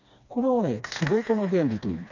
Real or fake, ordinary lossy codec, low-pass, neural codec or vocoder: fake; none; 7.2 kHz; codec, 16 kHz, 2 kbps, FreqCodec, smaller model